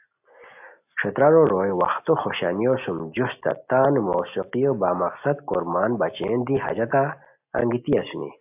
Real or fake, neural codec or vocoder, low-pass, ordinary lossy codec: real; none; 3.6 kHz; AAC, 32 kbps